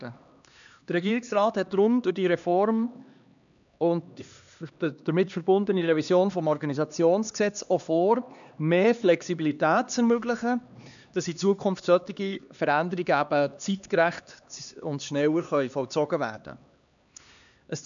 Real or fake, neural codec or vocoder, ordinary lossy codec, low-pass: fake; codec, 16 kHz, 2 kbps, X-Codec, HuBERT features, trained on LibriSpeech; none; 7.2 kHz